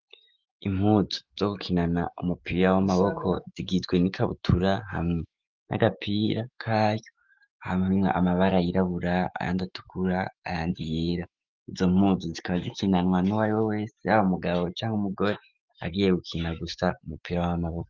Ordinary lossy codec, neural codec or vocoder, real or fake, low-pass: Opus, 24 kbps; autoencoder, 48 kHz, 128 numbers a frame, DAC-VAE, trained on Japanese speech; fake; 7.2 kHz